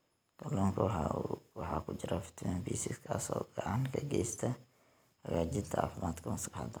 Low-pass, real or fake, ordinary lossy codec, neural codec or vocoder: none; real; none; none